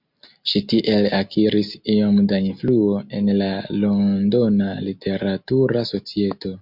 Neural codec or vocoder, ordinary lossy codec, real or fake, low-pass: none; MP3, 48 kbps; real; 5.4 kHz